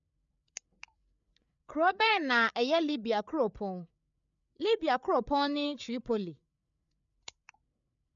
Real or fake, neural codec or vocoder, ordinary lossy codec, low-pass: fake; codec, 16 kHz, 8 kbps, FreqCodec, larger model; none; 7.2 kHz